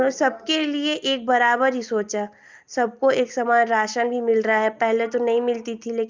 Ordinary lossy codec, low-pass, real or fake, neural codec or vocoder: Opus, 24 kbps; 7.2 kHz; real; none